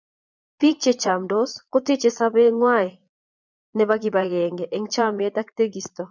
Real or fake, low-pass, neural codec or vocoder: fake; 7.2 kHz; vocoder, 22.05 kHz, 80 mel bands, Vocos